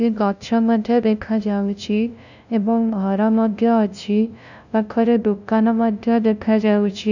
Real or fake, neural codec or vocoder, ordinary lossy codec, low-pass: fake; codec, 16 kHz, 0.5 kbps, FunCodec, trained on LibriTTS, 25 frames a second; none; 7.2 kHz